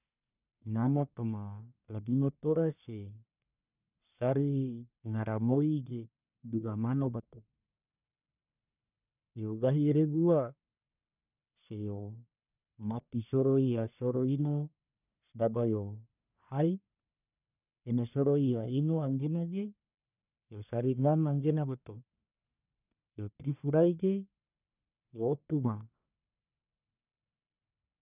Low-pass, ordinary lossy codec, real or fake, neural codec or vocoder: 3.6 kHz; none; fake; codec, 44.1 kHz, 1.7 kbps, Pupu-Codec